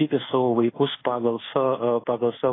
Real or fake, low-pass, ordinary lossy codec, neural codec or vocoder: fake; 7.2 kHz; AAC, 16 kbps; codec, 24 kHz, 1.2 kbps, DualCodec